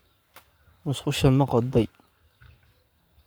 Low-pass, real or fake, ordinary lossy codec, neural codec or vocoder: none; fake; none; codec, 44.1 kHz, 7.8 kbps, Pupu-Codec